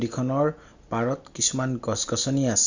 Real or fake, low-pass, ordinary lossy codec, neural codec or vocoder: real; 7.2 kHz; Opus, 64 kbps; none